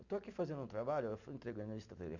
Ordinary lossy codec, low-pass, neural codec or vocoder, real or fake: none; 7.2 kHz; none; real